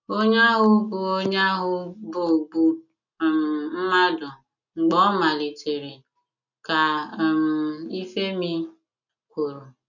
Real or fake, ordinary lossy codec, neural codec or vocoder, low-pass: real; none; none; 7.2 kHz